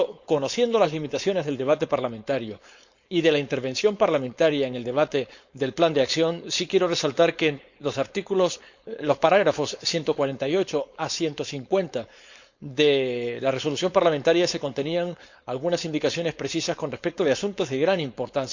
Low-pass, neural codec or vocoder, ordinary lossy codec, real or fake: 7.2 kHz; codec, 16 kHz, 4.8 kbps, FACodec; Opus, 64 kbps; fake